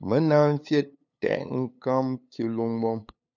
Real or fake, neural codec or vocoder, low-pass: fake; codec, 16 kHz, 8 kbps, FunCodec, trained on LibriTTS, 25 frames a second; 7.2 kHz